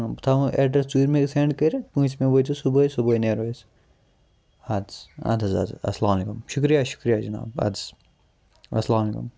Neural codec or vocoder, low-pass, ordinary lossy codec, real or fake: none; none; none; real